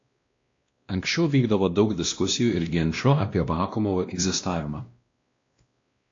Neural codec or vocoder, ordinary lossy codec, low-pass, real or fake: codec, 16 kHz, 1 kbps, X-Codec, WavLM features, trained on Multilingual LibriSpeech; AAC, 48 kbps; 7.2 kHz; fake